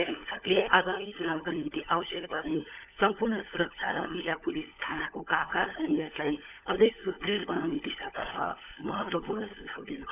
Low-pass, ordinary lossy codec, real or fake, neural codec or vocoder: 3.6 kHz; none; fake; codec, 16 kHz, 16 kbps, FunCodec, trained on LibriTTS, 50 frames a second